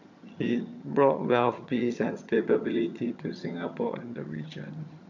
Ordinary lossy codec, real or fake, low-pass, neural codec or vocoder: none; fake; 7.2 kHz; vocoder, 22.05 kHz, 80 mel bands, HiFi-GAN